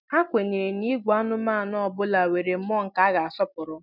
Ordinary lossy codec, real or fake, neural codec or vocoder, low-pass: none; real; none; 5.4 kHz